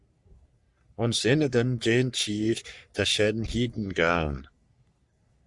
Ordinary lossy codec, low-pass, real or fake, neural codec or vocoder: Opus, 64 kbps; 10.8 kHz; fake; codec, 44.1 kHz, 3.4 kbps, Pupu-Codec